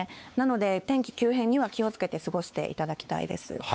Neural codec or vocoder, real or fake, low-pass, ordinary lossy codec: codec, 16 kHz, 4 kbps, X-Codec, WavLM features, trained on Multilingual LibriSpeech; fake; none; none